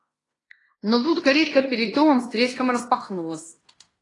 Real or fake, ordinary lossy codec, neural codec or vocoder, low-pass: fake; AAC, 32 kbps; codec, 16 kHz in and 24 kHz out, 0.9 kbps, LongCat-Audio-Codec, fine tuned four codebook decoder; 10.8 kHz